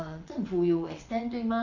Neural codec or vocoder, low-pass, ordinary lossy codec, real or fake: vocoder, 22.05 kHz, 80 mel bands, WaveNeXt; 7.2 kHz; none; fake